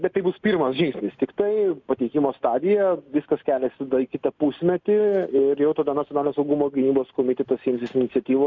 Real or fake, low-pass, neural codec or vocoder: real; 7.2 kHz; none